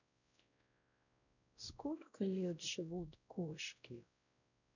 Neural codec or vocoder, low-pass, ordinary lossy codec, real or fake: codec, 16 kHz, 0.5 kbps, X-Codec, WavLM features, trained on Multilingual LibriSpeech; 7.2 kHz; none; fake